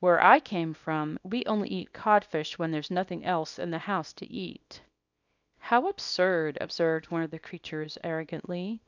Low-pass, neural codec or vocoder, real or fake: 7.2 kHz; codec, 24 kHz, 0.9 kbps, WavTokenizer, small release; fake